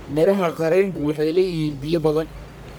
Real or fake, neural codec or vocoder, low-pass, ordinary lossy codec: fake; codec, 44.1 kHz, 1.7 kbps, Pupu-Codec; none; none